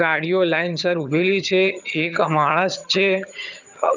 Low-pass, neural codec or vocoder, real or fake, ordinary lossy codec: 7.2 kHz; vocoder, 22.05 kHz, 80 mel bands, HiFi-GAN; fake; none